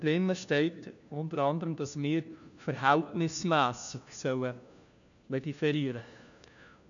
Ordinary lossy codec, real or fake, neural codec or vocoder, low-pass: none; fake; codec, 16 kHz, 1 kbps, FunCodec, trained on LibriTTS, 50 frames a second; 7.2 kHz